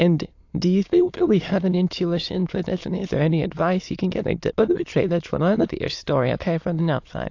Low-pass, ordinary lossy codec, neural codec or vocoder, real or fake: 7.2 kHz; AAC, 48 kbps; autoencoder, 22.05 kHz, a latent of 192 numbers a frame, VITS, trained on many speakers; fake